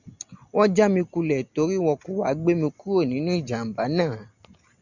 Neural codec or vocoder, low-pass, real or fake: none; 7.2 kHz; real